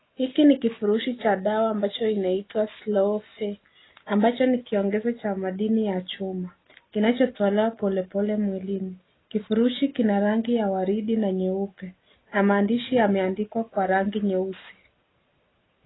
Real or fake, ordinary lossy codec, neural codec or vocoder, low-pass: real; AAC, 16 kbps; none; 7.2 kHz